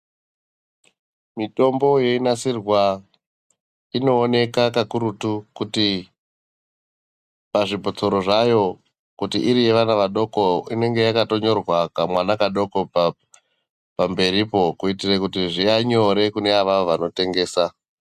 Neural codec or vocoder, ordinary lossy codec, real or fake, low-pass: none; Opus, 64 kbps; real; 14.4 kHz